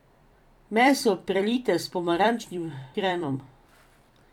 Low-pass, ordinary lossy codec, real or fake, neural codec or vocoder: 19.8 kHz; none; fake; vocoder, 44.1 kHz, 128 mel bands every 256 samples, BigVGAN v2